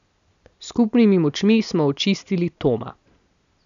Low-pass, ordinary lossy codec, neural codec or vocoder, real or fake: 7.2 kHz; none; none; real